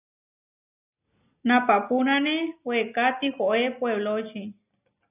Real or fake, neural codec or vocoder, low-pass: real; none; 3.6 kHz